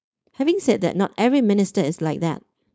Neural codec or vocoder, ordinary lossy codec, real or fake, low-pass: codec, 16 kHz, 4.8 kbps, FACodec; none; fake; none